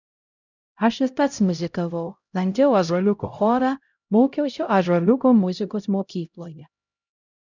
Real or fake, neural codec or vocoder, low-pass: fake; codec, 16 kHz, 0.5 kbps, X-Codec, HuBERT features, trained on LibriSpeech; 7.2 kHz